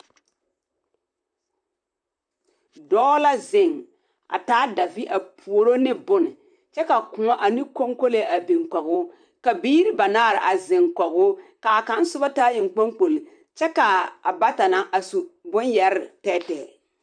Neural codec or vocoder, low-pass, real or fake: vocoder, 44.1 kHz, 128 mel bands, Pupu-Vocoder; 9.9 kHz; fake